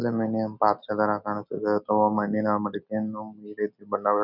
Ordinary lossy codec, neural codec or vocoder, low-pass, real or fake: none; none; 5.4 kHz; real